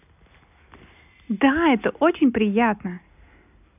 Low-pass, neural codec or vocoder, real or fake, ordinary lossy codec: 3.6 kHz; none; real; none